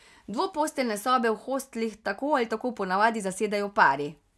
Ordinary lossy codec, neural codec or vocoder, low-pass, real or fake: none; none; none; real